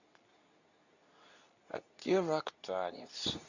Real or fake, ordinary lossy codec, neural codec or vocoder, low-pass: fake; none; codec, 24 kHz, 0.9 kbps, WavTokenizer, medium speech release version 2; 7.2 kHz